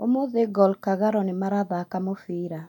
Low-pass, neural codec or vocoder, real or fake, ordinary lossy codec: 19.8 kHz; none; real; none